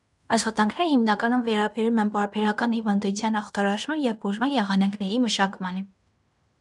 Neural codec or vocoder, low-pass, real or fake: codec, 16 kHz in and 24 kHz out, 0.9 kbps, LongCat-Audio-Codec, fine tuned four codebook decoder; 10.8 kHz; fake